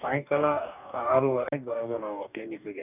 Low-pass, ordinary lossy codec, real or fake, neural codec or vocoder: 3.6 kHz; none; fake; codec, 44.1 kHz, 2.6 kbps, DAC